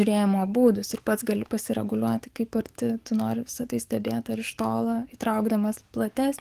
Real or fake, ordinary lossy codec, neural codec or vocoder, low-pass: fake; Opus, 24 kbps; codec, 44.1 kHz, 7.8 kbps, DAC; 14.4 kHz